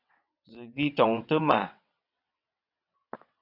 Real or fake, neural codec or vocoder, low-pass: fake; vocoder, 22.05 kHz, 80 mel bands, WaveNeXt; 5.4 kHz